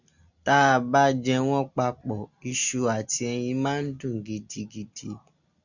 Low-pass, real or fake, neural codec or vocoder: 7.2 kHz; real; none